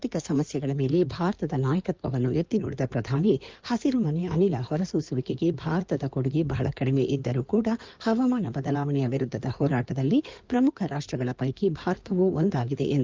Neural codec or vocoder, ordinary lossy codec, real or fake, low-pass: codec, 16 kHz in and 24 kHz out, 2.2 kbps, FireRedTTS-2 codec; Opus, 32 kbps; fake; 7.2 kHz